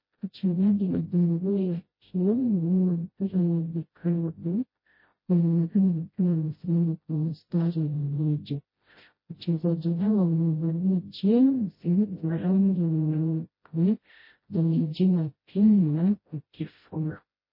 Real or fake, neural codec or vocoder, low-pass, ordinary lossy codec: fake; codec, 16 kHz, 0.5 kbps, FreqCodec, smaller model; 5.4 kHz; MP3, 24 kbps